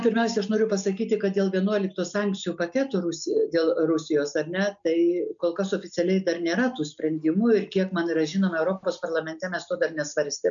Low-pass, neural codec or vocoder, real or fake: 7.2 kHz; none; real